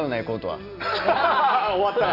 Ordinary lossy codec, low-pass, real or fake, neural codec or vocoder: none; 5.4 kHz; real; none